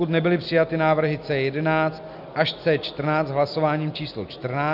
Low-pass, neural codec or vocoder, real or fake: 5.4 kHz; none; real